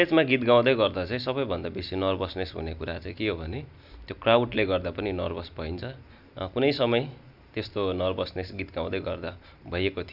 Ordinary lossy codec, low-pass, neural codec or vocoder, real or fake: none; 5.4 kHz; none; real